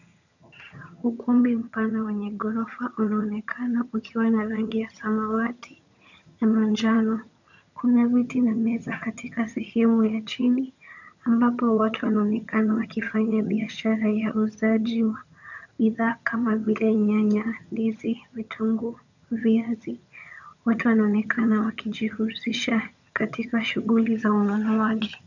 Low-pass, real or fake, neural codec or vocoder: 7.2 kHz; fake; vocoder, 22.05 kHz, 80 mel bands, HiFi-GAN